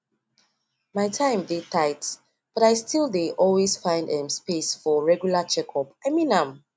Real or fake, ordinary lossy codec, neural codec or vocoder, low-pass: real; none; none; none